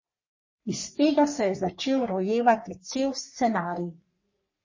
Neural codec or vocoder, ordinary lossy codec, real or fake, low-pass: codec, 44.1 kHz, 3.4 kbps, Pupu-Codec; MP3, 32 kbps; fake; 7.2 kHz